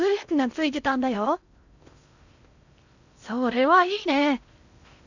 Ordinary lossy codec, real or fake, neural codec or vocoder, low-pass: none; fake; codec, 16 kHz in and 24 kHz out, 0.6 kbps, FocalCodec, streaming, 2048 codes; 7.2 kHz